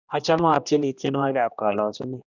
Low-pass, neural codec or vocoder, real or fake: 7.2 kHz; codec, 16 kHz, 1 kbps, X-Codec, HuBERT features, trained on general audio; fake